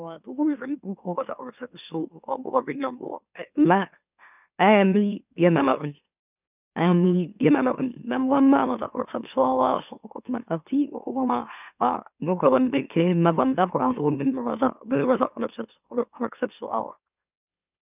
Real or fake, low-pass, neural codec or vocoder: fake; 3.6 kHz; autoencoder, 44.1 kHz, a latent of 192 numbers a frame, MeloTTS